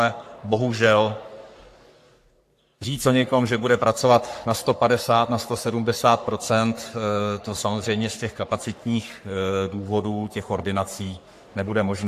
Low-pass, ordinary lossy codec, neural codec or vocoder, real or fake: 14.4 kHz; AAC, 64 kbps; codec, 44.1 kHz, 3.4 kbps, Pupu-Codec; fake